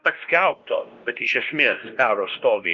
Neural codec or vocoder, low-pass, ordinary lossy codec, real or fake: codec, 16 kHz, 1 kbps, X-Codec, WavLM features, trained on Multilingual LibriSpeech; 7.2 kHz; Opus, 24 kbps; fake